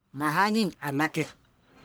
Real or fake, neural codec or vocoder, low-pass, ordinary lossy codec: fake; codec, 44.1 kHz, 1.7 kbps, Pupu-Codec; none; none